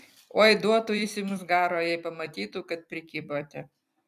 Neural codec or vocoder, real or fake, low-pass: vocoder, 44.1 kHz, 128 mel bands every 256 samples, BigVGAN v2; fake; 14.4 kHz